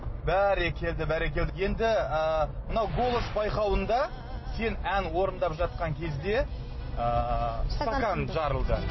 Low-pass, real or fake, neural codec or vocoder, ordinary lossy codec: 7.2 kHz; real; none; MP3, 24 kbps